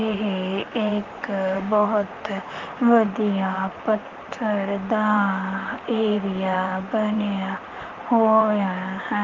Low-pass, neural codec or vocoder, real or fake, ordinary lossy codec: 7.2 kHz; vocoder, 44.1 kHz, 128 mel bands, Pupu-Vocoder; fake; Opus, 24 kbps